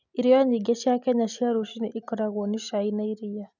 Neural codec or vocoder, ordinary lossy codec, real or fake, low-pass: none; none; real; 7.2 kHz